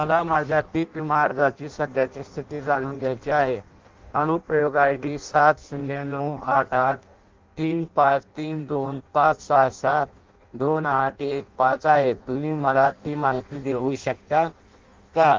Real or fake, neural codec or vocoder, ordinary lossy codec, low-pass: fake; codec, 16 kHz in and 24 kHz out, 0.6 kbps, FireRedTTS-2 codec; Opus, 32 kbps; 7.2 kHz